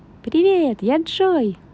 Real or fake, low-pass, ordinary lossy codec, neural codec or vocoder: real; none; none; none